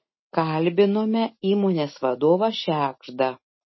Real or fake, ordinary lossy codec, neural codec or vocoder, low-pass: real; MP3, 24 kbps; none; 7.2 kHz